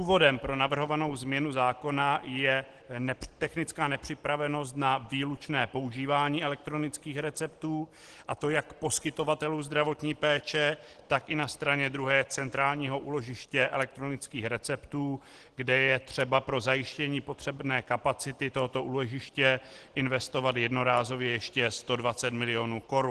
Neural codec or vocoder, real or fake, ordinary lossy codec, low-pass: none; real; Opus, 16 kbps; 10.8 kHz